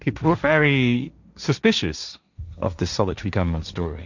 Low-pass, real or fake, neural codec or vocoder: 7.2 kHz; fake; codec, 16 kHz, 1.1 kbps, Voila-Tokenizer